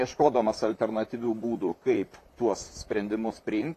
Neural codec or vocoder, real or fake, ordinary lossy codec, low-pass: vocoder, 44.1 kHz, 128 mel bands, Pupu-Vocoder; fake; AAC, 64 kbps; 14.4 kHz